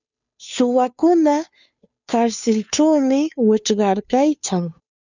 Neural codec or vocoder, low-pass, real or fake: codec, 16 kHz, 2 kbps, FunCodec, trained on Chinese and English, 25 frames a second; 7.2 kHz; fake